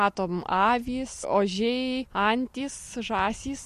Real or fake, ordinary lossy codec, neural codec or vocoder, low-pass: real; MP3, 64 kbps; none; 14.4 kHz